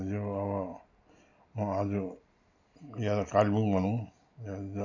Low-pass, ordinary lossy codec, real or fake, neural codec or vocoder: 7.2 kHz; none; real; none